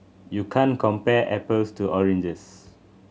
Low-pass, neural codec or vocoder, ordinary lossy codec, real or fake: none; none; none; real